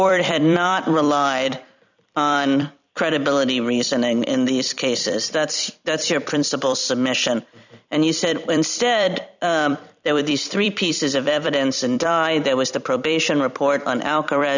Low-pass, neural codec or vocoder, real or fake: 7.2 kHz; vocoder, 44.1 kHz, 128 mel bands every 256 samples, BigVGAN v2; fake